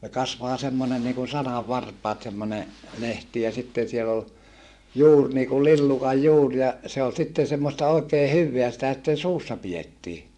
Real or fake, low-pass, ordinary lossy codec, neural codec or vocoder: fake; none; none; vocoder, 24 kHz, 100 mel bands, Vocos